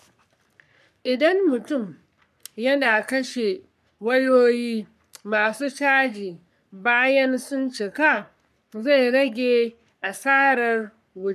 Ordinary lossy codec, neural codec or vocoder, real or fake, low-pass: none; codec, 44.1 kHz, 3.4 kbps, Pupu-Codec; fake; 14.4 kHz